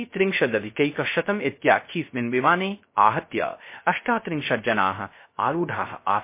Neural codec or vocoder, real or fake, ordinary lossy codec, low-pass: codec, 16 kHz, 0.3 kbps, FocalCodec; fake; MP3, 24 kbps; 3.6 kHz